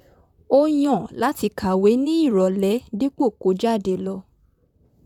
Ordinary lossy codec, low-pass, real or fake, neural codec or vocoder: none; 19.8 kHz; fake; vocoder, 44.1 kHz, 128 mel bands, Pupu-Vocoder